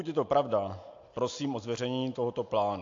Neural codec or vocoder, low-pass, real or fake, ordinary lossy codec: none; 7.2 kHz; real; AAC, 48 kbps